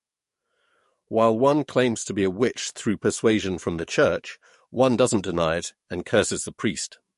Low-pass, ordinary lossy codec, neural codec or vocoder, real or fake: 14.4 kHz; MP3, 48 kbps; codec, 44.1 kHz, 7.8 kbps, DAC; fake